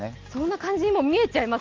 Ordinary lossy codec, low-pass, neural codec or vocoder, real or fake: Opus, 16 kbps; 7.2 kHz; none; real